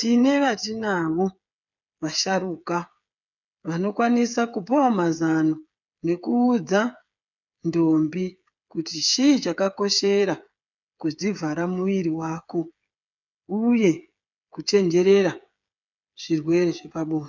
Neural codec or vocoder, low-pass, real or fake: codec, 16 kHz, 8 kbps, FreqCodec, smaller model; 7.2 kHz; fake